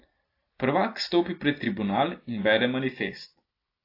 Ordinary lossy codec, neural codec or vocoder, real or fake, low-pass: AAC, 32 kbps; none; real; 5.4 kHz